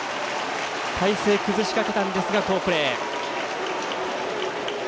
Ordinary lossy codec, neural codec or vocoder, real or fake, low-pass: none; none; real; none